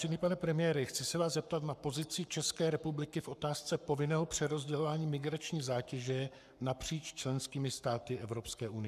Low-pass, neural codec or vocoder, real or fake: 14.4 kHz; codec, 44.1 kHz, 7.8 kbps, Pupu-Codec; fake